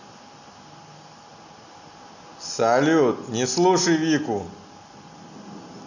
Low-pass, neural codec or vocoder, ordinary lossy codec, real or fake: 7.2 kHz; none; none; real